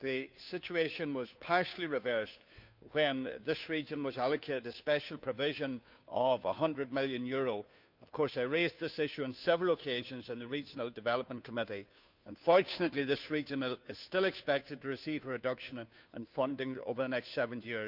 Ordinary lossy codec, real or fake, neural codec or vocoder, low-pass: none; fake; codec, 16 kHz, 2 kbps, FunCodec, trained on Chinese and English, 25 frames a second; 5.4 kHz